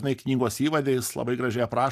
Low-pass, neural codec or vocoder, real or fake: 14.4 kHz; none; real